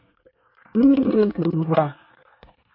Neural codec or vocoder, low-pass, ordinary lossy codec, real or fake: codec, 24 kHz, 1 kbps, SNAC; 5.4 kHz; MP3, 32 kbps; fake